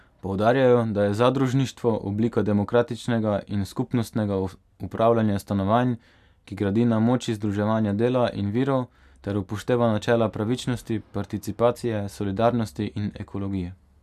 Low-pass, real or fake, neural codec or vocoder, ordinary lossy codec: 14.4 kHz; real; none; none